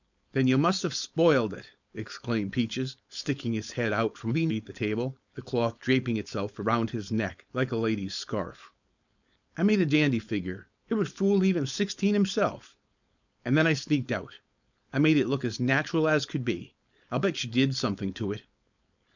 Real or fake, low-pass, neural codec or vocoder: fake; 7.2 kHz; codec, 16 kHz, 4.8 kbps, FACodec